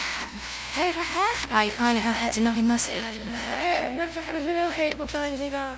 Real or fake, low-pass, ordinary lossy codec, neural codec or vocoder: fake; none; none; codec, 16 kHz, 0.5 kbps, FunCodec, trained on LibriTTS, 25 frames a second